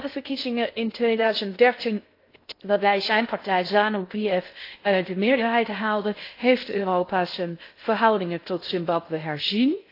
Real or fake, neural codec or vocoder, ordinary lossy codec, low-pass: fake; codec, 16 kHz in and 24 kHz out, 0.6 kbps, FocalCodec, streaming, 2048 codes; AAC, 32 kbps; 5.4 kHz